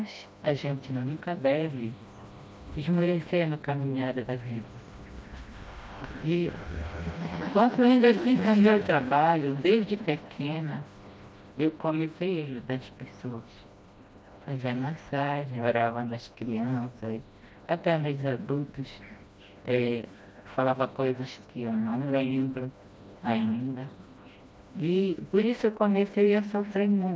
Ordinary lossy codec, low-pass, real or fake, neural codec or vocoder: none; none; fake; codec, 16 kHz, 1 kbps, FreqCodec, smaller model